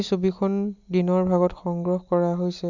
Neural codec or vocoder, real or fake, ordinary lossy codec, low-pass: none; real; none; 7.2 kHz